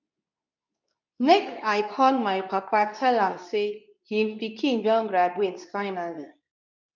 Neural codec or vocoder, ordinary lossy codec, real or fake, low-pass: codec, 24 kHz, 0.9 kbps, WavTokenizer, medium speech release version 2; none; fake; 7.2 kHz